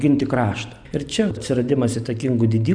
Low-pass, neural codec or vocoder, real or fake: 9.9 kHz; none; real